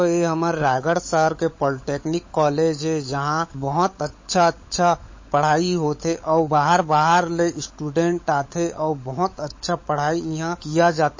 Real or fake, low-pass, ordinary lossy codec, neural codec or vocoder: fake; 7.2 kHz; MP3, 32 kbps; codec, 16 kHz, 16 kbps, FunCodec, trained on LibriTTS, 50 frames a second